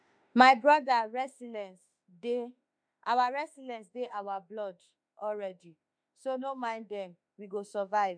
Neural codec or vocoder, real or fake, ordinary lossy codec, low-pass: autoencoder, 48 kHz, 32 numbers a frame, DAC-VAE, trained on Japanese speech; fake; none; 9.9 kHz